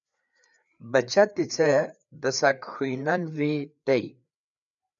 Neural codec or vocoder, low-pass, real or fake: codec, 16 kHz, 4 kbps, FreqCodec, larger model; 7.2 kHz; fake